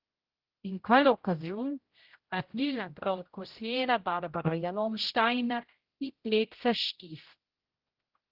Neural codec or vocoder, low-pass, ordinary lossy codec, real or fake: codec, 16 kHz, 0.5 kbps, X-Codec, HuBERT features, trained on general audio; 5.4 kHz; Opus, 16 kbps; fake